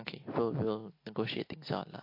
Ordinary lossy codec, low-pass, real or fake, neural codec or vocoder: MP3, 32 kbps; 5.4 kHz; real; none